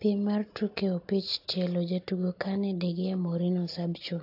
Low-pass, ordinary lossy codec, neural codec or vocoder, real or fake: 5.4 kHz; AAC, 32 kbps; none; real